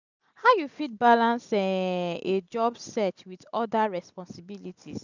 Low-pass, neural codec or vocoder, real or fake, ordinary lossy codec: 7.2 kHz; none; real; none